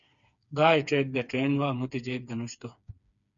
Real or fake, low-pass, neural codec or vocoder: fake; 7.2 kHz; codec, 16 kHz, 4 kbps, FreqCodec, smaller model